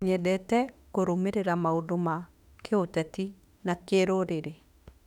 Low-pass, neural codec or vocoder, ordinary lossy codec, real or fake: 19.8 kHz; autoencoder, 48 kHz, 32 numbers a frame, DAC-VAE, trained on Japanese speech; none; fake